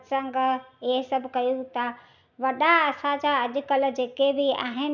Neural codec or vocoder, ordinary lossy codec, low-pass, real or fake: none; none; 7.2 kHz; real